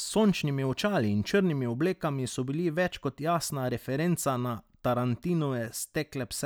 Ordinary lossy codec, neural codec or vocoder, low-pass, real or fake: none; none; none; real